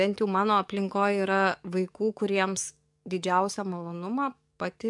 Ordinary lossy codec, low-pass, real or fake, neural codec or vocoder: MP3, 64 kbps; 10.8 kHz; fake; codec, 44.1 kHz, 7.8 kbps, DAC